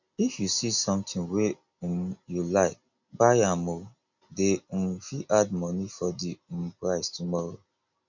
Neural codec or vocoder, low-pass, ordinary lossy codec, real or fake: none; 7.2 kHz; none; real